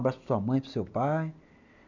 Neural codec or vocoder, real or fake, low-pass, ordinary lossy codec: none; real; 7.2 kHz; none